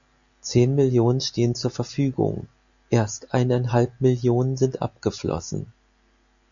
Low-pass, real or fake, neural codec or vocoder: 7.2 kHz; real; none